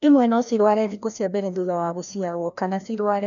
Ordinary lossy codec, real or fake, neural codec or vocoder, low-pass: none; fake; codec, 16 kHz, 1 kbps, FreqCodec, larger model; 7.2 kHz